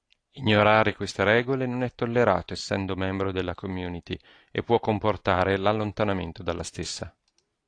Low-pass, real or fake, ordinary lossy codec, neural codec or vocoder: 9.9 kHz; real; AAC, 48 kbps; none